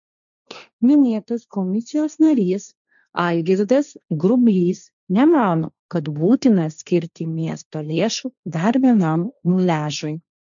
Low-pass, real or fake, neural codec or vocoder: 7.2 kHz; fake; codec, 16 kHz, 1.1 kbps, Voila-Tokenizer